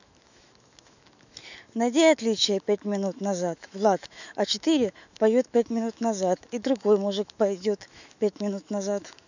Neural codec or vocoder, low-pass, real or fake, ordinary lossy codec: autoencoder, 48 kHz, 128 numbers a frame, DAC-VAE, trained on Japanese speech; 7.2 kHz; fake; none